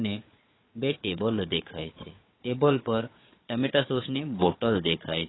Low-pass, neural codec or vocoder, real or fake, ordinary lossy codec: 7.2 kHz; codec, 16 kHz, 4 kbps, FunCodec, trained on Chinese and English, 50 frames a second; fake; AAC, 16 kbps